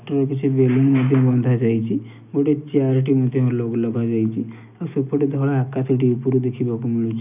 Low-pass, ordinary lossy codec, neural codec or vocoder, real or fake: 3.6 kHz; none; none; real